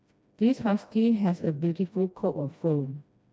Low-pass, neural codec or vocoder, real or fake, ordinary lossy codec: none; codec, 16 kHz, 1 kbps, FreqCodec, smaller model; fake; none